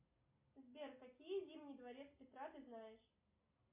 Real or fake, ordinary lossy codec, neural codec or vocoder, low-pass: real; MP3, 24 kbps; none; 3.6 kHz